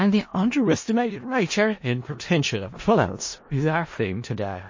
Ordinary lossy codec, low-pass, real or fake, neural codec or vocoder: MP3, 32 kbps; 7.2 kHz; fake; codec, 16 kHz in and 24 kHz out, 0.4 kbps, LongCat-Audio-Codec, four codebook decoder